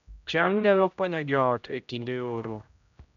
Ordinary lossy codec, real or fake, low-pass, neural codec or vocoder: none; fake; 7.2 kHz; codec, 16 kHz, 0.5 kbps, X-Codec, HuBERT features, trained on general audio